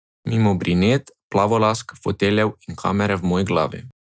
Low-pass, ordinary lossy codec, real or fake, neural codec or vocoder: none; none; real; none